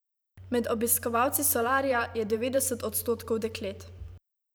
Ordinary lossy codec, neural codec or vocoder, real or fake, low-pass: none; vocoder, 44.1 kHz, 128 mel bands every 512 samples, BigVGAN v2; fake; none